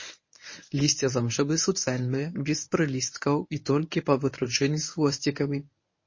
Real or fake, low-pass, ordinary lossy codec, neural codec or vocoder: fake; 7.2 kHz; MP3, 32 kbps; codec, 24 kHz, 0.9 kbps, WavTokenizer, medium speech release version 1